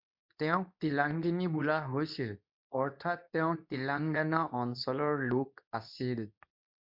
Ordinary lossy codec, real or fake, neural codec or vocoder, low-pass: MP3, 48 kbps; fake; codec, 24 kHz, 0.9 kbps, WavTokenizer, medium speech release version 2; 5.4 kHz